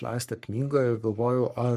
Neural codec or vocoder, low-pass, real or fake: codec, 44.1 kHz, 3.4 kbps, Pupu-Codec; 14.4 kHz; fake